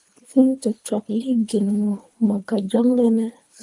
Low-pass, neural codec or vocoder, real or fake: 10.8 kHz; codec, 24 kHz, 3 kbps, HILCodec; fake